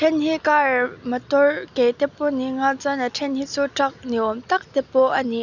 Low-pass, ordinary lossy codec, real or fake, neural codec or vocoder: 7.2 kHz; none; real; none